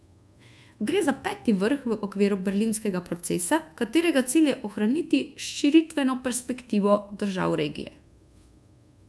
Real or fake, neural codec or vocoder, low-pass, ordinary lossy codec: fake; codec, 24 kHz, 1.2 kbps, DualCodec; none; none